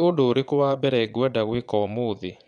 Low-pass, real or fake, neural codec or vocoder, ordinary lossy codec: 9.9 kHz; fake; vocoder, 22.05 kHz, 80 mel bands, Vocos; none